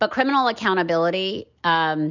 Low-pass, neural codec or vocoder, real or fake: 7.2 kHz; none; real